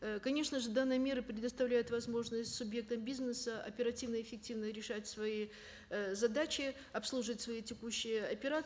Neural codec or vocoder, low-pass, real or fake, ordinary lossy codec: none; none; real; none